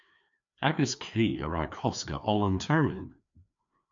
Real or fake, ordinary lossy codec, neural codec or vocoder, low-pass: fake; MP3, 64 kbps; codec, 16 kHz, 2 kbps, FreqCodec, larger model; 7.2 kHz